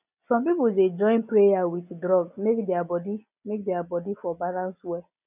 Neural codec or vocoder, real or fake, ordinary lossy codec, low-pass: none; real; none; 3.6 kHz